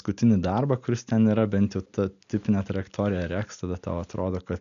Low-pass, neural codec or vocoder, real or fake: 7.2 kHz; none; real